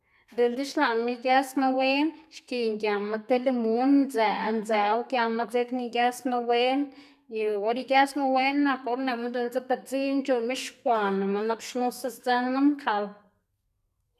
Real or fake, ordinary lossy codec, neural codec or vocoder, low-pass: fake; none; codec, 32 kHz, 1.9 kbps, SNAC; 14.4 kHz